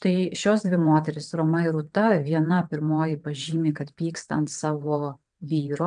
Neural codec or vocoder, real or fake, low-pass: vocoder, 22.05 kHz, 80 mel bands, WaveNeXt; fake; 9.9 kHz